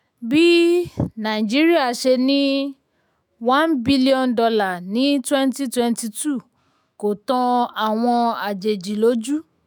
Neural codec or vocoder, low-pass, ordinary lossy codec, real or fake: autoencoder, 48 kHz, 128 numbers a frame, DAC-VAE, trained on Japanese speech; none; none; fake